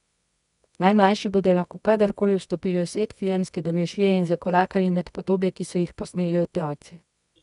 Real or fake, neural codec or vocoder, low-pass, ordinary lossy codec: fake; codec, 24 kHz, 0.9 kbps, WavTokenizer, medium music audio release; 10.8 kHz; none